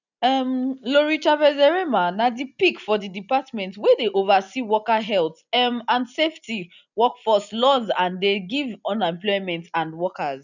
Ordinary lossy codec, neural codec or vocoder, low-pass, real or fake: none; none; 7.2 kHz; real